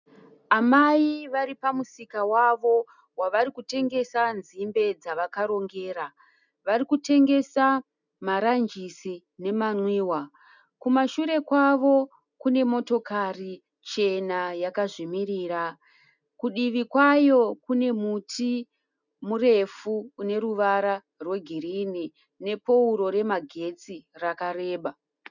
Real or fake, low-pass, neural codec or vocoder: real; 7.2 kHz; none